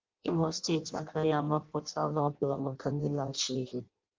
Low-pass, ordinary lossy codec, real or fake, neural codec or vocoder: 7.2 kHz; Opus, 24 kbps; fake; codec, 16 kHz in and 24 kHz out, 0.6 kbps, FireRedTTS-2 codec